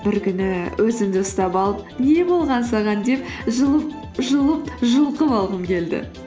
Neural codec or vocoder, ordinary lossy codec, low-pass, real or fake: none; none; none; real